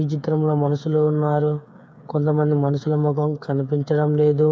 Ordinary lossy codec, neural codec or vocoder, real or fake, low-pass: none; codec, 16 kHz, 8 kbps, FreqCodec, smaller model; fake; none